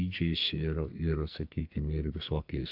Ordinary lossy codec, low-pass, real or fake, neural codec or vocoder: AAC, 48 kbps; 5.4 kHz; fake; codec, 44.1 kHz, 2.6 kbps, SNAC